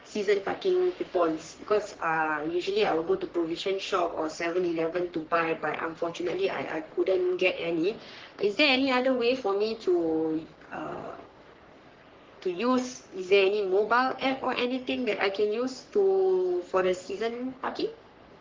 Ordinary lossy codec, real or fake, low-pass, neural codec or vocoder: Opus, 16 kbps; fake; 7.2 kHz; codec, 44.1 kHz, 3.4 kbps, Pupu-Codec